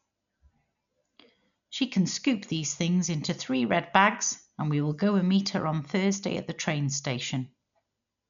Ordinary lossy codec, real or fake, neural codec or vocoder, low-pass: none; real; none; 7.2 kHz